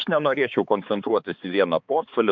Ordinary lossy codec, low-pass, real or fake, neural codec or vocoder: MP3, 64 kbps; 7.2 kHz; fake; codec, 16 kHz, 4 kbps, X-Codec, HuBERT features, trained on balanced general audio